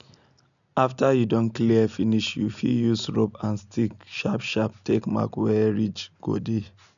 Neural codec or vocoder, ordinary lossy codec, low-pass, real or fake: none; none; 7.2 kHz; real